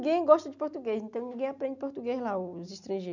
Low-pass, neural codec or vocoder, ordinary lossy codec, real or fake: 7.2 kHz; none; none; real